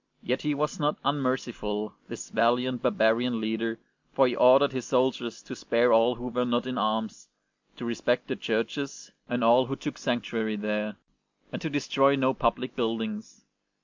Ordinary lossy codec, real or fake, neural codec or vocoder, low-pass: MP3, 64 kbps; real; none; 7.2 kHz